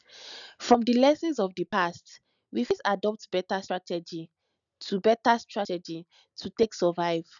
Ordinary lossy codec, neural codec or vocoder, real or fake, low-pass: none; none; real; 7.2 kHz